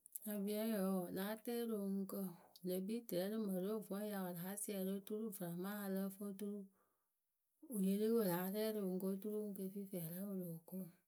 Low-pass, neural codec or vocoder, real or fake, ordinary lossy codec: none; none; real; none